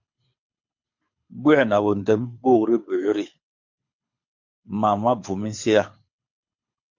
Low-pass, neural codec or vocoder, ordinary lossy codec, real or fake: 7.2 kHz; codec, 24 kHz, 6 kbps, HILCodec; MP3, 48 kbps; fake